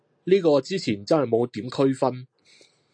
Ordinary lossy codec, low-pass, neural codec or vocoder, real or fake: AAC, 64 kbps; 9.9 kHz; none; real